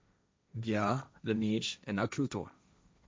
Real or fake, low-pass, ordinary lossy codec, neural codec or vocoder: fake; none; none; codec, 16 kHz, 1.1 kbps, Voila-Tokenizer